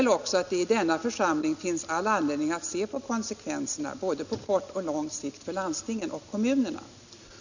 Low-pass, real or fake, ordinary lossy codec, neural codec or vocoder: 7.2 kHz; real; none; none